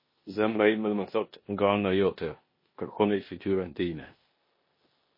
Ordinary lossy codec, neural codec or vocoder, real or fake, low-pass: MP3, 24 kbps; codec, 16 kHz in and 24 kHz out, 0.9 kbps, LongCat-Audio-Codec, four codebook decoder; fake; 5.4 kHz